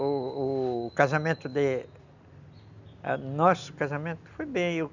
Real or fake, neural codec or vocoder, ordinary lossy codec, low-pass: real; none; none; 7.2 kHz